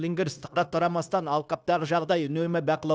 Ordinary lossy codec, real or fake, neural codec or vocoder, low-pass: none; fake; codec, 16 kHz, 0.9 kbps, LongCat-Audio-Codec; none